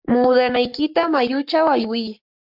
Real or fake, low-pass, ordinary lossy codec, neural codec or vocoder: fake; 5.4 kHz; MP3, 48 kbps; codec, 44.1 kHz, 7.8 kbps, Pupu-Codec